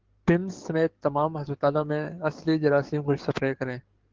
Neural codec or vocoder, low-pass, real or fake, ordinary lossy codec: codec, 44.1 kHz, 7.8 kbps, DAC; 7.2 kHz; fake; Opus, 16 kbps